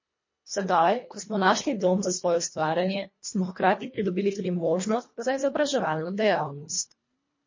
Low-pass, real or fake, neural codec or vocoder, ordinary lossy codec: 7.2 kHz; fake; codec, 24 kHz, 1.5 kbps, HILCodec; MP3, 32 kbps